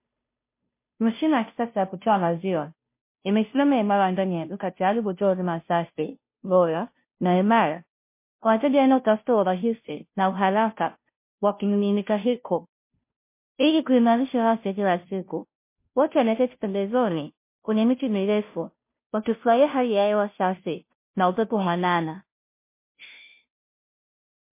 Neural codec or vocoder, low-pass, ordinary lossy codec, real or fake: codec, 16 kHz, 0.5 kbps, FunCodec, trained on Chinese and English, 25 frames a second; 3.6 kHz; MP3, 24 kbps; fake